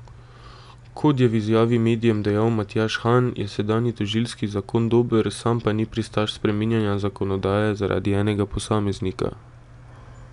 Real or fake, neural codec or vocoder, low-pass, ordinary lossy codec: real; none; 10.8 kHz; none